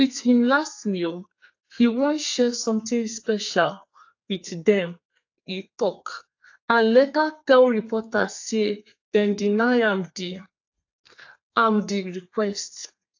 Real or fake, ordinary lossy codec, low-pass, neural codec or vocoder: fake; AAC, 48 kbps; 7.2 kHz; codec, 32 kHz, 1.9 kbps, SNAC